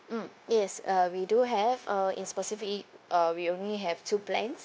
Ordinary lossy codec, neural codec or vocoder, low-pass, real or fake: none; codec, 16 kHz, 0.9 kbps, LongCat-Audio-Codec; none; fake